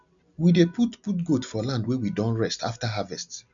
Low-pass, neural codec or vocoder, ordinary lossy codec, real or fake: 7.2 kHz; none; none; real